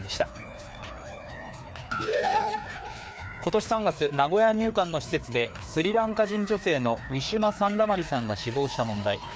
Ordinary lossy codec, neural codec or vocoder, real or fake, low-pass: none; codec, 16 kHz, 2 kbps, FreqCodec, larger model; fake; none